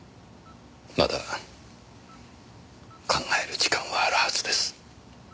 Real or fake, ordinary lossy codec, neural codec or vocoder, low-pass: real; none; none; none